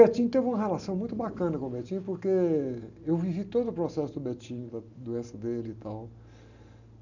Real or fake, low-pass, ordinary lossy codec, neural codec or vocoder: real; 7.2 kHz; none; none